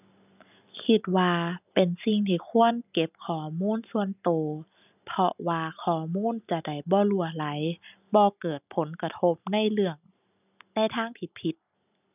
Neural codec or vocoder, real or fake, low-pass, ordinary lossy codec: none; real; 3.6 kHz; none